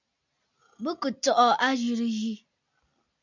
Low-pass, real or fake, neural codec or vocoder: 7.2 kHz; real; none